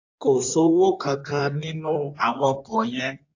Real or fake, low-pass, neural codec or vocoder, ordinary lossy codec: fake; 7.2 kHz; codec, 16 kHz in and 24 kHz out, 1.1 kbps, FireRedTTS-2 codec; AAC, 48 kbps